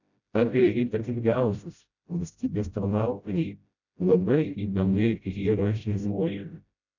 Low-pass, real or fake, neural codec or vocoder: 7.2 kHz; fake; codec, 16 kHz, 0.5 kbps, FreqCodec, smaller model